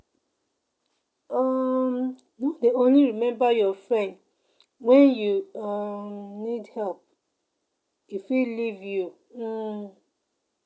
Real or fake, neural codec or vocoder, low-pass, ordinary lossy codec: real; none; none; none